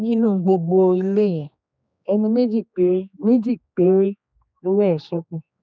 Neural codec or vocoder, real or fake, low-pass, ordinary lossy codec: codec, 16 kHz, 2 kbps, X-Codec, HuBERT features, trained on general audio; fake; none; none